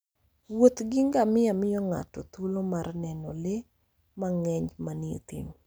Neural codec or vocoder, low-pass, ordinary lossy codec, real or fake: none; none; none; real